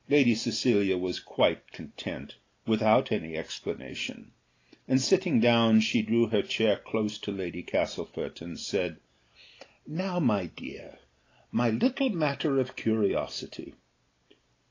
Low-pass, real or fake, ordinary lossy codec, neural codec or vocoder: 7.2 kHz; real; AAC, 32 kbps; none